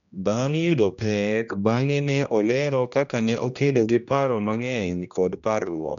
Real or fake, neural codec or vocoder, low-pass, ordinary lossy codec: fake; codec, 16 kHz, 1 kbps, X-Codec, HuBERT features, trained on general audio; 7.2 kHz; none